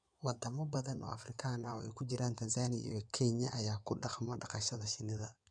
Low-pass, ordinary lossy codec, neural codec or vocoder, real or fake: 9.9 kHz; none; codec, 16 kHz in and 24 kHz out, 2.2 kbps, FireRedTTS-2 codec; fake